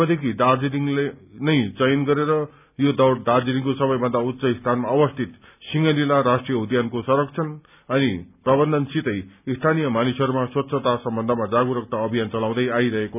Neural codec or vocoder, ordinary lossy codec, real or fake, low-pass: none; none; real; 3.6 kHz